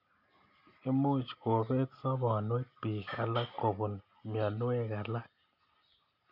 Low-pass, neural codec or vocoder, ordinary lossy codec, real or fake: 5.4 kHz; none; none; real